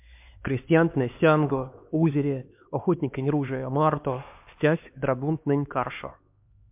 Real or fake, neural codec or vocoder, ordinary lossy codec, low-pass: fake; codec, 16 kHz, 2 kbps, X-Codec, HuBERT features, trained on LibriSpeech; MP3, 32 kbps; 3.6 kHz